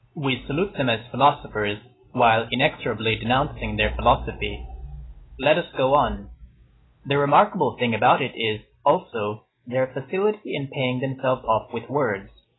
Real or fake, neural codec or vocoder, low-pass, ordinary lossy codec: real; none; 7.2 kHz; AAC, 16 kbps